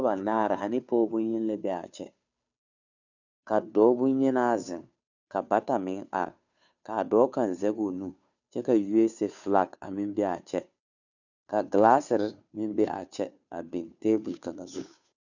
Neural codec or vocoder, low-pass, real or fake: codec, 16 kHz, 2 kbps, FunCodec, trained on Chinese and English, 25 frames a second; 7.2 kHz; fake